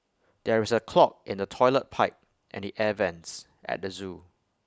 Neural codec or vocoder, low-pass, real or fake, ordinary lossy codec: none; none; real; none